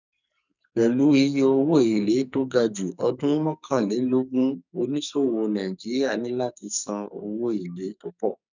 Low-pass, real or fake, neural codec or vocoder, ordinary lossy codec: 7.2 kHz; fake; codec, 44.1 kHz, 2.6 kbps, SNAC; none